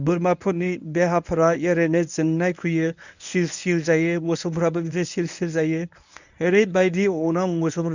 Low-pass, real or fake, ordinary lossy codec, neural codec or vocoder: 7.2 kHz; fake; none; codec, 24 kHz, 0.9 kbps, WavTokenizer, medium speech release version 1